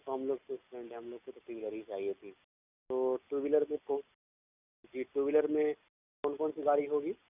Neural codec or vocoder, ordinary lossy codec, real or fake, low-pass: none; none; real; 3.6 kHz